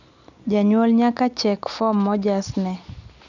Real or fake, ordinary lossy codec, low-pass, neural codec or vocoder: real; none; 7.2 kHz; none